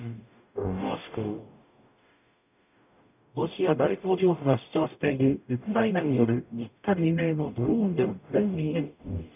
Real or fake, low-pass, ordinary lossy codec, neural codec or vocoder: fake; 3.6 kHz; none; codec, 44.1 kHz, 0.9 kbps, DAC